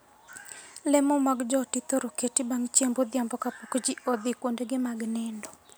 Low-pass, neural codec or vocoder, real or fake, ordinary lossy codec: none; none; real; none